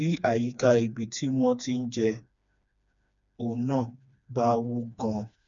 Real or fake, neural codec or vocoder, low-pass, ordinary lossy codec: fake; codec, 16 kHz, 2 kbps, FreqCodec, smaller model; 7.2 kHz; none